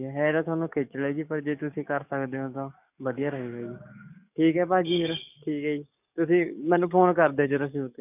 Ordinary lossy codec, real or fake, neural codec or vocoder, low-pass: none; fake; codec, 44.1 kHz, 7.8 kbps, DAC; 3.6 kHz